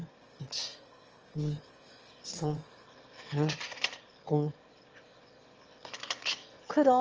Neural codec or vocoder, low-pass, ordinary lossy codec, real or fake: autoencoder, 22.05 kHz, a latent of 192 numbers a frame, VITS, trained on one speaker; 7.2 kHz; Opus, 24 kbps; fake